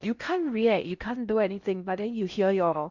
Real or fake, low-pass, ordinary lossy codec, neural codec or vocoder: fake; 7.2 kHz; Opus, 64 kbps; codec, 16 kHz in and 24 kHz out, 0.6 kbps, FocalCodec, streaming, 4096 codes